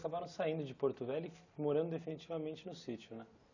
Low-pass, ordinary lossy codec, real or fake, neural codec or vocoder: 7.2 kHz; none; real; none